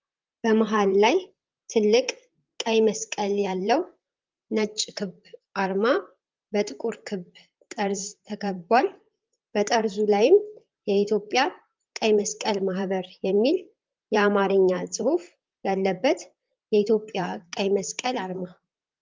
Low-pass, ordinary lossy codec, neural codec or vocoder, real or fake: 7.2 kHz; Opus, 24 kbps; vocoder, 44.1 kHz, 128 mel bands, Pupu-Vocoder; fake